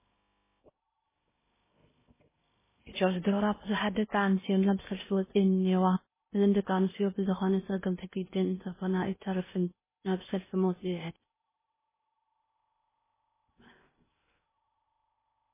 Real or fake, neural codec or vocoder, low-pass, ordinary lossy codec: fake; codec, 16 kHz in and 24 kHz out, 0.6 kbps, FocalCodec, streaming, 2048 codes; 3.6 kHz; MP3, 16 kbps